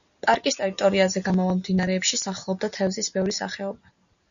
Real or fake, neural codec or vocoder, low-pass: real; none; 7.2 kHz